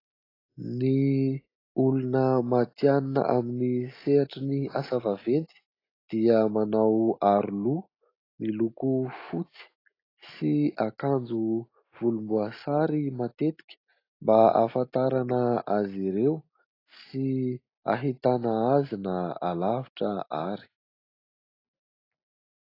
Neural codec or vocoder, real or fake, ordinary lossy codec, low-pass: none; real; AAC, 24 kbps; 5.4 kHz